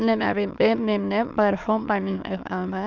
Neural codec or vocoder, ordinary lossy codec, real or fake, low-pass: autoencoder, 22.05 kHz, a latent of 192 numbers a frame, VITS, trained on many speakers; none; fake; 7.2 kHz